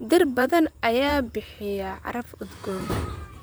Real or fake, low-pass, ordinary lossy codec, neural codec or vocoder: fake; none; none; vocoder, 44.1 kHz, 128 mel bands, Pupu-Vocoder